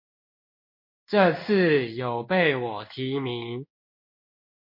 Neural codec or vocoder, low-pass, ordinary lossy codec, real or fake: codec, 16 kHz in and 24 kHz out, 1 kbps, XY-Tokenizer; 5.4 kHz; MP3, 32 kbps; fake